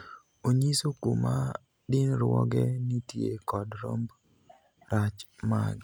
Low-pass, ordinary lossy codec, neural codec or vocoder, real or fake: none; none; none; real